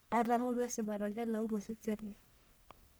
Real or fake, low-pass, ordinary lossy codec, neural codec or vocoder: fake; none; none; codec, 44.1 kHz, 1.7 kbps, Pupu-Codec